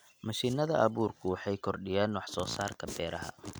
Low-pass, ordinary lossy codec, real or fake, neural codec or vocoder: none; none; real; none